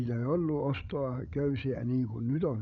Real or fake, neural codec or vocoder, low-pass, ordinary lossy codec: fake; codec, 16 kHz, 16 kbps, FreqCodec, larger model; 7.2 kHz; none